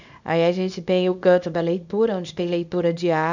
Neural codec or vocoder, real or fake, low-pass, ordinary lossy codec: codec, 24 kHz, 0.9 kbps, WavTokenizer, small release; fake; 7.2 kHz; none